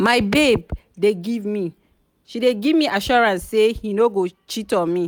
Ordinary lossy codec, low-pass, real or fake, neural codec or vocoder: none; none; real; none